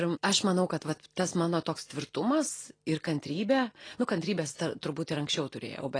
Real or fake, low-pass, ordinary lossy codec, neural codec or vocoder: real; 9.9 kHz; AAC, 32 kbps; none